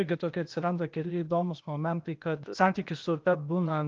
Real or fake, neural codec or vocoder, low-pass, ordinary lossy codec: fake; codec, 16 kHz, 0.8 kbps, ZipCodec; 7.2 kHz; Opus, 24 kbps